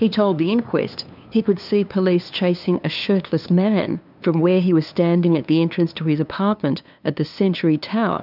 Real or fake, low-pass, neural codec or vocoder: fake; 5.4 kHz; codec, 16 kHz, 2 kbps, FunCodec, trained on LibriTTS, 25 frames a second